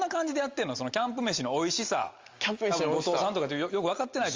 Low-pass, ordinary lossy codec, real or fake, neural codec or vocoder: 7.2 kHz; Opus, 32 kbps; real; none